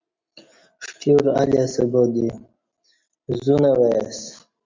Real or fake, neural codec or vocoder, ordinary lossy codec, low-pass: real; none; MP3, 64 kbps; 7.2 kHz